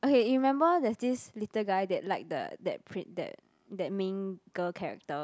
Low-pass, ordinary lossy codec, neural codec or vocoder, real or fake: none; none; none; real